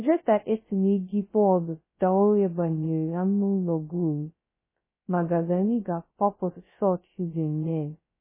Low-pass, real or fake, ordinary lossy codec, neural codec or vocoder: 3.6 kHz; fake; MP3, 16 kbps; codec, 16 kHz, 0.2 kbps, FocalCodec